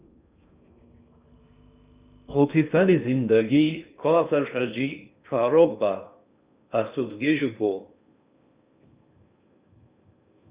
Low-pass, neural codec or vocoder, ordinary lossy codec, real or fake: 3.6 kHz; codec, 16 kHz in and 24 kHz out, 0.6 kbps, FocalCodec, streaming, 2048 codes; Opus, 64 kbps; fake